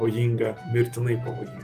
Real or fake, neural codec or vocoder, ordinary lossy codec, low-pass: real; none; Opus, 32 kbps; 14.4 kHz